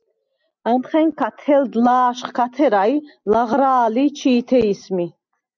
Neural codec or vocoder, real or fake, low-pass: none; real; 7.2 kHz